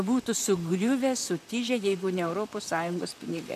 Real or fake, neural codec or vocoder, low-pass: fake; vocoder, 44.1 kHz, 128 mel bands, Pupu-Vocoder; 14.4 kHz